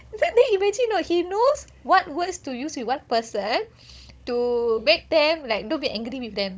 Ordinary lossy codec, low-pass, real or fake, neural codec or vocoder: none; none; fake; codec, 16 kHz, 16 kbps, FunCodec, trained on Chinese and English, 50 frames a second